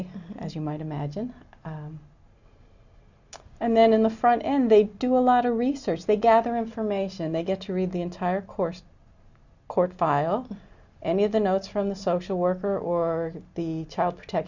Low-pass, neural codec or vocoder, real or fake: 7.2 kHz; none; real